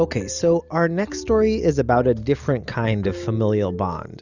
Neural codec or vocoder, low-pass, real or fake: none; 7.2 kHz; real